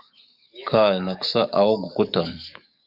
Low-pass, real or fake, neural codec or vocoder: 5.4 kHz; fake; codec, 44.1 kHz, 7.8 kbps, DAC